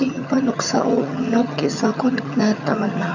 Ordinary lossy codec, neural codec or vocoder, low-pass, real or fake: none; vocoder, 22.05 kHz, 80 mel bands, HiFi-GAN; 7.2 kHz; fake